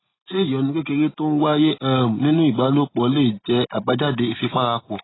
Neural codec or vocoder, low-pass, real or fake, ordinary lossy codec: none; 7.2 kHz; real; AAC, 16 kbps